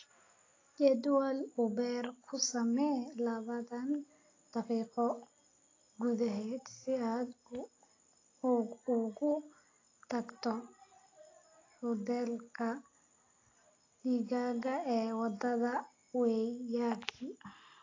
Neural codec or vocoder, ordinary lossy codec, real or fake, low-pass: none; AAC, 32 kbps; real; 7.2 kHz